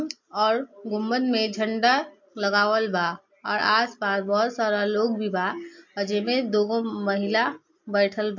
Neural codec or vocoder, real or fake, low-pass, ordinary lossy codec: none; real; 7.2 kHz; MP3, 48 kbps